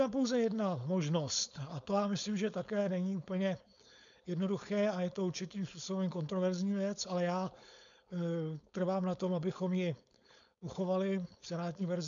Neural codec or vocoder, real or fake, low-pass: codec, 16 kHz, 4.8 kbps, FACodec; fake; 7.2 kHz